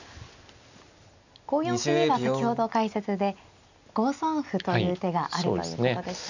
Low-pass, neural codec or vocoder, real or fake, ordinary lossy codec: 7.2 kHz; none; real; none